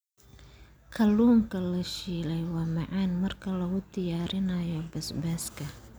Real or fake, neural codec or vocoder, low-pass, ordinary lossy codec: real; none; none; none